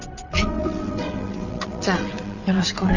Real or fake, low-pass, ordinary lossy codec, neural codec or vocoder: fake; 7.2 kHz; none; vocoder, 22.05 kHz, 80 mel bands, WaveNeXt